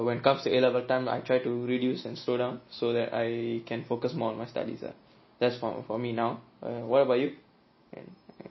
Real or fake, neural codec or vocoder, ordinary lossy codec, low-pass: real; none; MP3, 24 kbps; 7.2 kHz